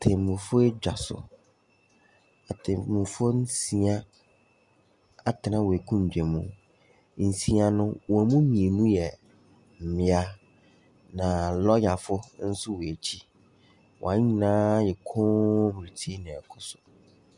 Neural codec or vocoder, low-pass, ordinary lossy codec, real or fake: none; 10.8 kHz; Opus, 64 kbps; real